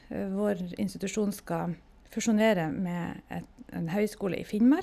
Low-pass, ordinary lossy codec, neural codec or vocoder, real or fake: 14.4 kHz; none; none; real